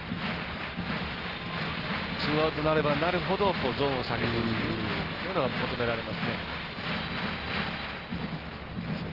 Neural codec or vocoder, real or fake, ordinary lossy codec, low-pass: none; real; Opus, 16 kbps; 5.4 kHz